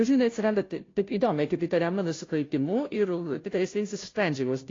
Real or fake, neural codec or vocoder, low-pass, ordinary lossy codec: fake; codec, 16 kHz, 0.5 kbps, FunCodec, trained on Chinese and English, 25 frames a second; 7.2 kHz; AAC, 32 kbps